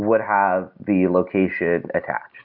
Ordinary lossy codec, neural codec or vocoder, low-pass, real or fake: Opus, 64 kbps; none; 5.4 kHz; real